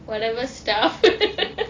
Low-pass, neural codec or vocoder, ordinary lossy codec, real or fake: 7.2 kHz; none; AAC, 32 kbps; real